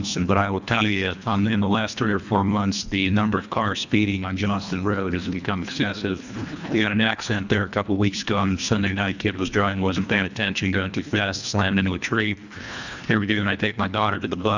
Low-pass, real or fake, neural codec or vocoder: 7.2 kHz; fake; codec, 24 kHz, 1.5 kbps, HILCodec